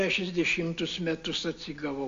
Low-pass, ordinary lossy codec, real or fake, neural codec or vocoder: 7.2 kHz; AAC, 48 kbps; real; none